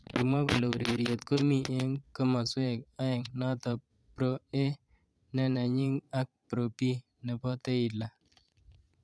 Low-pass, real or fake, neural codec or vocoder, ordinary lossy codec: none; fake; vocoder, 22.05 kHz, 80 mel bands, Vocos; none